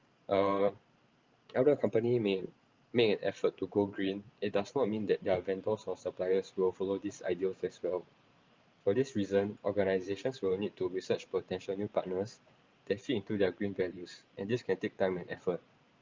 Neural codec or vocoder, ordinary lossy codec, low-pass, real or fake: vocoder, 44.1 kHz, 128 mel bands every 512 samples, BigVGAN v2; Opus, 24 kbps; 7.2 kHz; fake